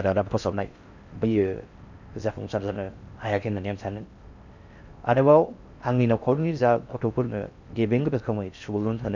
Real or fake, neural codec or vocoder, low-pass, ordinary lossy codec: fake; codec, 16 kHz in and 24 kHz out, 0.6 kbps, FocalCodec, streaming, 4096 codes; 7.2 kHz; none